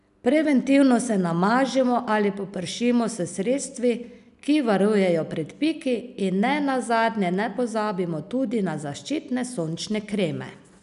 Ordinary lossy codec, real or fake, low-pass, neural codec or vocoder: none; real; 10.8 kHz; none